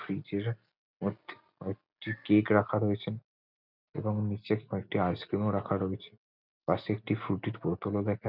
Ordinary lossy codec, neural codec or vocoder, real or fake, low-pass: none; none; real; 5.4 kHz